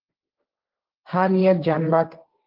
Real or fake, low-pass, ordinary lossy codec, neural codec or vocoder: fake; 5.4 kHz; Opus, 32 kbps; codec, 16 kHz, 1.1 kbps, Voila-Tokenizer